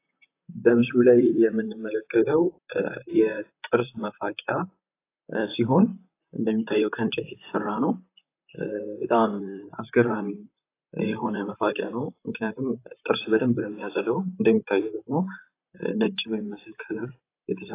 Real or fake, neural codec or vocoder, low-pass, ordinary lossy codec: fake; vocoder, 44.1 kHz, 128 mel bands, Pupu-Vocoder; 3.6 kHz; AAC, 24 kbps